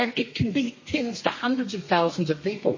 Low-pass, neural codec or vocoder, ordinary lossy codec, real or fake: 7.2 kHz; codec, 32 kHz, 1.9 kbps, SNAC; MP3, 32 kbps; fake